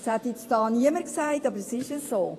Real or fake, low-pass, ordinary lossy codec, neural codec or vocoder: fake; 14.4 kHz; AAC, 48 kbps; vocoder, 48 kHz, 128 mel bands, Vocos